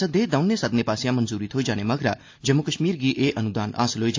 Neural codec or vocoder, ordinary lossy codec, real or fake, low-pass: none; AAC, 48 kbps; real; 7.2 kHz